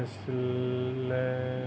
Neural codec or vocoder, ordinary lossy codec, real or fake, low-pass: none; none; real; none